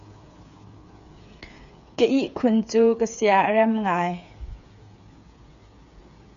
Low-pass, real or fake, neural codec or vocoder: 7.2 kHz; fake; codec, 16 kHz, 8 kbps, FreqCodec, smaller model